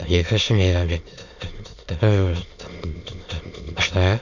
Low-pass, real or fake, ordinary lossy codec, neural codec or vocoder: 7.2 kHz; fake; none; autoencoder, 22.05 kHz, a latent of 192 numbers a frame, VITS, trained on many speakers